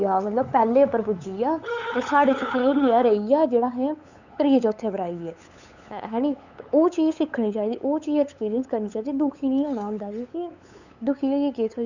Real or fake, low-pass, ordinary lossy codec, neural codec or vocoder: fake; 7.2 kHz; none; codec, 16 kHz, 8 kbps, FunCodec, trained on LibriTTS, 25 frames a second